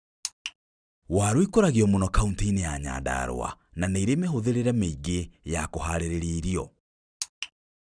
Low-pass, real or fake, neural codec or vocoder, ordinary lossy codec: 9.9 kHz; real; none; none